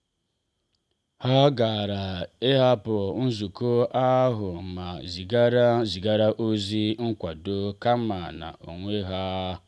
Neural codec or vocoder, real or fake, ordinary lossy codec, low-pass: none; real; none; none